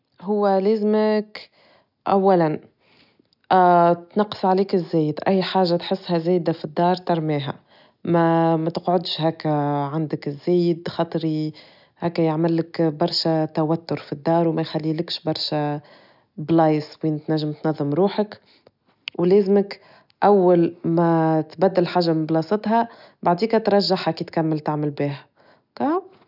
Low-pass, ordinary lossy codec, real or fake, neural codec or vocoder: 5.4 kHz; none; real; none